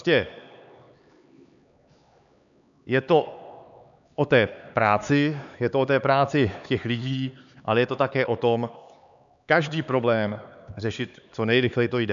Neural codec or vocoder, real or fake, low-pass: codec, 16 kHz, 4 kbps, X-Codec, HuBERT features, trained on LibriSpeech; fake; 7.2 kHz